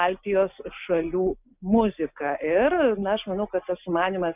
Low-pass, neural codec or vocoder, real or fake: 3.6 kHz; none; real